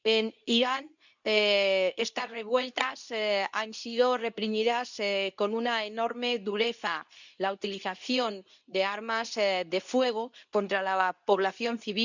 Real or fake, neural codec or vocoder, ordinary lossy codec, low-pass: fake; codec, 24 kHz, 0.9 kbps, WavTokenizer, medium speech release version 2; none; 7.2 kHz